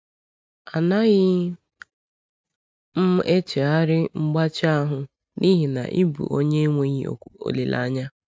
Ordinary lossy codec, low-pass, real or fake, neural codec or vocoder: none; none; real; none